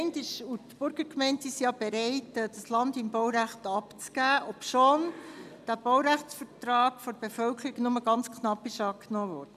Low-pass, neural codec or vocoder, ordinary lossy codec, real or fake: 14.4 kHz; none; none; real